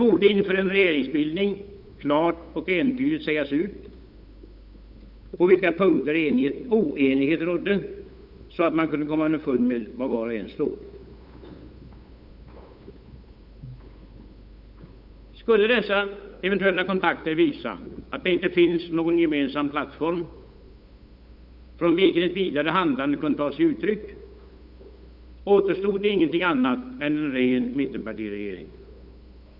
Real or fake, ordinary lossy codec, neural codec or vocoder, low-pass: fake; Opus, 64 kbps; codec, 16 kHz, 8 kbps, FunCodec, trained on LibriTTS, 25 frames a second; 5.4 kHz